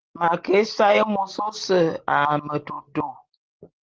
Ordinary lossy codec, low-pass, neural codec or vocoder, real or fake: Opus, 16 kbps; 7.2 kHz; none; real